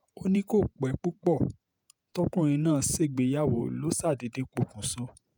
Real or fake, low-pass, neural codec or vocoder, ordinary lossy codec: fake; none; vocoder, 48 kHz, 128 mel bands, Vocos; none